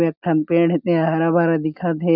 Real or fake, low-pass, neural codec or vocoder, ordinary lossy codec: real; 5.4 kHz; none; none